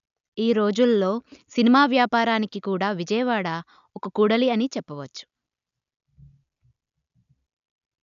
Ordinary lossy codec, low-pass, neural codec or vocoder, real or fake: none; 7.2 kHz; none; real